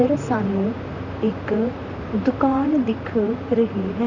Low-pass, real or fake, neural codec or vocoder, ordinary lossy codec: 7.2 kHz; fake; vocoder, 44.1 kHz, 128 mel bands, Pupu-Vocoder; Opus, 64 kbps